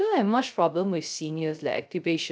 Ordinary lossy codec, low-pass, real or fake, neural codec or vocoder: none; none; fake; codec, 16 kHz, 0.3 kbps, FocalCodec